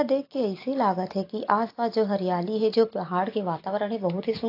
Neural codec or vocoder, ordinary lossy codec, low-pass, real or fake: none; AAC, 32 kbps; 5.4 kHz; real